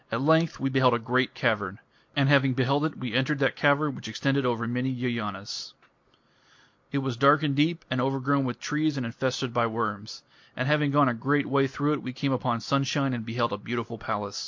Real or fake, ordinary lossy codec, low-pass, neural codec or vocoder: real; MP3, 48 kbps; 7.2 kHz; none